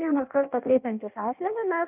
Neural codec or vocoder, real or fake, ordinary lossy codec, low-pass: codec, 16 kHz in and 24 kHz out, 0.6 kbps, FireRedTTS-2 codec; fake; AAC, 32 kbps; 3.6 kHz